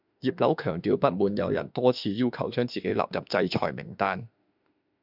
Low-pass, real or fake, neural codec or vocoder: 5.4 kHz; fake; autoencoder, 48 kHz, 32 numbers a frame, DAC-VAE, trained on Japanese speech